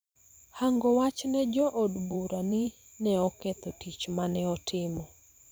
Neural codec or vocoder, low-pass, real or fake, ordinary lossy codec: vocoder, 44.1 kHz, 128 mel bands every 512 samples, BigVGAN v2; none; fake; none